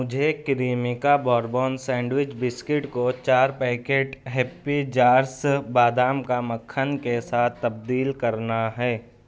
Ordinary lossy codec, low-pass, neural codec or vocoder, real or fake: none; none; none; real